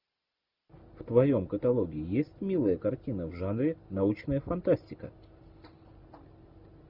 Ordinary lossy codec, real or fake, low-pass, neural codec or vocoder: Opus, 64 kbps; real; 5.4 kHz; none